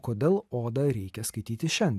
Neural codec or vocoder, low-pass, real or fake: none; 14.4 kHz; real